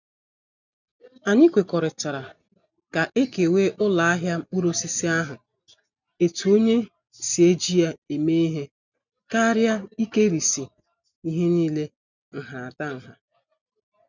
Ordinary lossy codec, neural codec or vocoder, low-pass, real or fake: none; none; 7.2 kHz; real